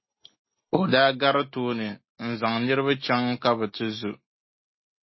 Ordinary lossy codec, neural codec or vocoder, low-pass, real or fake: MP3, 24 kbps; none; 7.2 kHz; real